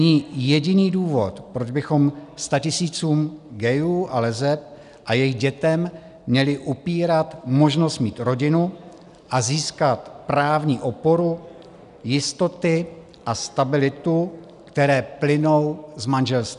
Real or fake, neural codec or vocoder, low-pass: real; none; 10.8 kHz